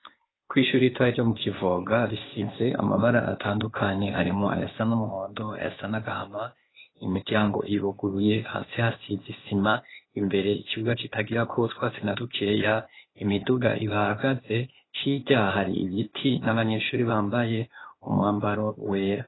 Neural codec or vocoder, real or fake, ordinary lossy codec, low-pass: codec, 16 kHz, 0.8 kbps, ZipCodec; fake; AAC, 16 kbps; 7.2 kHz